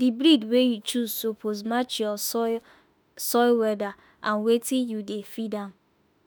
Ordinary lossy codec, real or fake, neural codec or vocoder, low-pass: none; fake; autoencoder, 48 kHz, 32 numbers a frame, DAC-VAE, trained on Japanese speech; none